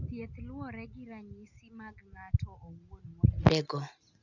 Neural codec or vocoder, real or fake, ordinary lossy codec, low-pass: none; real; none; 7.2 kHz